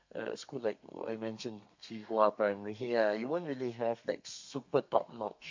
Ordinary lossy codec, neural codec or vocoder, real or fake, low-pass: MP3, 48 kbps; codec, 32 kHz, 1.9 kbps, SNAC; fake; 7.2 kHz